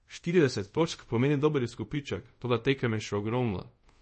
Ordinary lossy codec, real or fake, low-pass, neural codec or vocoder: MP3, 32 kbps; fake; 10.8 kHz; codec, 24 kHz, 0.5 kbps, DualCodec